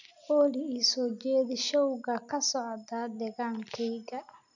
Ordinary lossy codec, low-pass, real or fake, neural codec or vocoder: none; 7.2 kHz; real; none